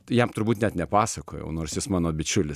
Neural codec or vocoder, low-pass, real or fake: none; 14.4 kHz; real